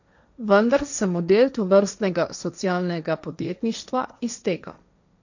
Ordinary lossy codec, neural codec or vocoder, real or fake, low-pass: none; codec, 16 kHz, 1.1 kbps, Voila-Tokenizer; fake; 7.2 kHz